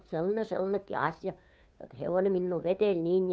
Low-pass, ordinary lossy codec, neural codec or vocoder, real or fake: none; none; codec, 16 kHz, 2 kbps, FunCodec, trained on Chinese and English, 25 frames a second; fake